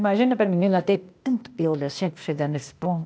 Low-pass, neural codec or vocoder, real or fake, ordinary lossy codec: none; codec, 16 kHz, 0.8 kbps, ZipCodec; fake; none